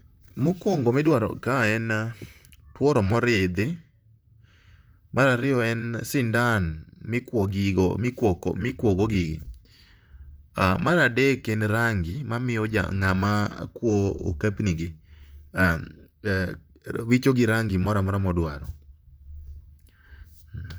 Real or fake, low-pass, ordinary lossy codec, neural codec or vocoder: fake; none; none; vocoder, 44.1 kHz, 128 mel bands, Pupu-Vocoder